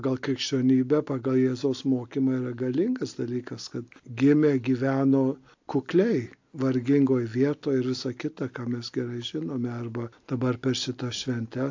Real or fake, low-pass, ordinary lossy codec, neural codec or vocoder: real; 7.2 kHz; AAC, 48 kbps; none